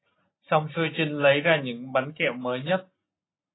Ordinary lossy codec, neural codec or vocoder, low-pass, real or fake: AAC, 16 kbps; none; 7.2 kHz; real